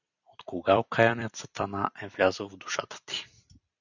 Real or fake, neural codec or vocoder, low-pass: fake; vocoder, 44.1 kHz, 128 mel bands every 256 samples, BigVGAN v2; 7.2 kHz